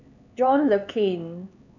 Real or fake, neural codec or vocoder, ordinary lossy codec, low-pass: fake; codec, 16 kHz, 4 kbps, X-Codec, HuBERT features, trained on LibriSpeech; none; 7.2 kHz